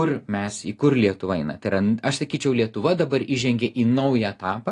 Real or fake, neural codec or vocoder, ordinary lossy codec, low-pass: real; none; AAC, 48 kbps; 10.8 kHz